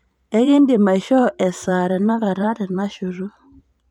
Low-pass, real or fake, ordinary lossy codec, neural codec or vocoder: 19.8 kHz; fake; none; vocoder, 44.1 kHz, 128 mel bands every 512 samples, BigVGAN v2